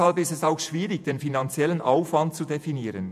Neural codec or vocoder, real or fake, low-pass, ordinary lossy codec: vocoder, 48 kHz, 128 mel bands, Vocos; fake; 14.4 kHz; none